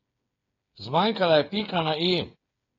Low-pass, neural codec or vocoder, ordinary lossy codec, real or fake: 7.2 kHz; codec, 16 kHz, 8 kbps, FreqCodec, smaller model; AAC, 32 kbps; fake